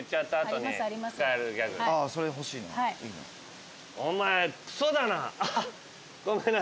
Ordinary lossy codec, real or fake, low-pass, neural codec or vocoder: none; real; none; none